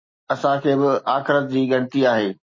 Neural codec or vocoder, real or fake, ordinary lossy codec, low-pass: none; real; MP3, 32 kbps; 7.2 kHz